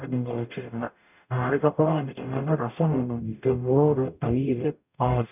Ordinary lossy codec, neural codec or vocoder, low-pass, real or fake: none; codec, 44.1 kHz, 0.9 kbps, DAC; 3.6 kHz; fake